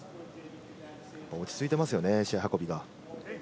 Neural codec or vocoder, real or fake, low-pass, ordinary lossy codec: none; real; none; none